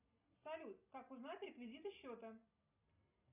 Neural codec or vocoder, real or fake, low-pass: none; real; 3.6 kHz